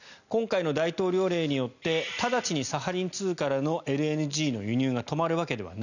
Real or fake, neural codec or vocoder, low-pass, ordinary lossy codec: real; none; 7.2 kHz; none